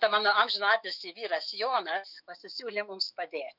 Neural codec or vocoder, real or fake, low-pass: autoencoder, 48 kHz, 128 numbers a frame, DAC-VAE, trained on Japanese speech; fake; 5.4 kHz